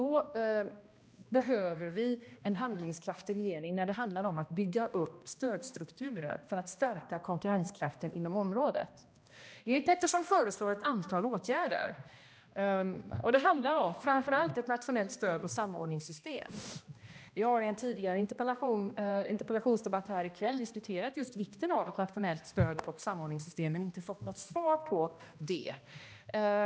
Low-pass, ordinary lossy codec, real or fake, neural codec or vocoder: none; none; fake; codec, 16 kHz, 1 kbps, X-Codec, HuBERT features, trained on balanced general audio